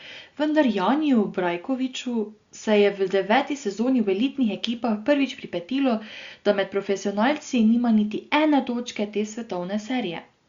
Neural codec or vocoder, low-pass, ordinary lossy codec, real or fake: none; 7.2 kHz; Opus, 64 kbps; real